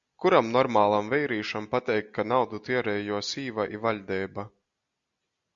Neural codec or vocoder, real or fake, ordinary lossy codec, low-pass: none; real; Opus, 64 kbps; 7.2 kHz